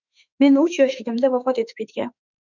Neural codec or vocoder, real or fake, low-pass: autoencoder, 48 kHz, 32 numbers a frame, DAC-VAE, trained on Japanese speech; fake; 7.2 kHz